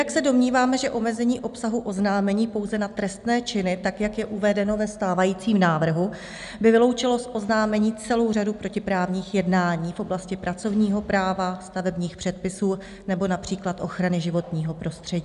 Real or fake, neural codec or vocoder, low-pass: real; none; 10.8 kHz